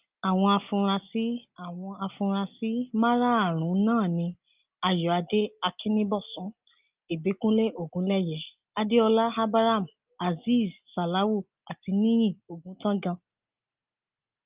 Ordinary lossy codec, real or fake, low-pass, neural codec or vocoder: Opus, 24 kbps; real; 3.6 kHz; none